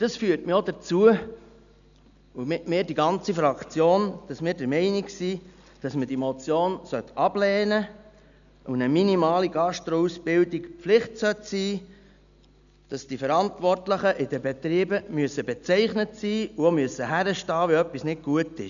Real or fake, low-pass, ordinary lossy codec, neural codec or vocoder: real; 7.2 kHz; none; none